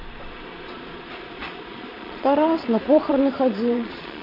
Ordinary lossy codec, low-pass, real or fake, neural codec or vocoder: none; 5.4 kHz; fake; vocoder, 22.05 kHz, 80 mel bands, Vocos